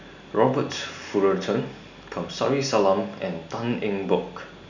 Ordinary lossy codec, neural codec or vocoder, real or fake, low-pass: none; none; real; 7.2 kHz